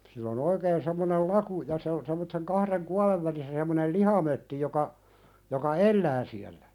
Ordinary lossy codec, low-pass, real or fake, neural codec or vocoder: none; 19.8 kHz; real; none